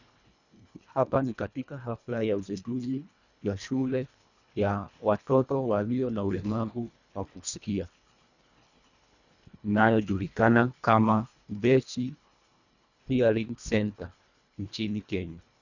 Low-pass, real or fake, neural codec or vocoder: 7.2 kHz; fake; codec, 24 kHz, 1.5 kbps, HILCodec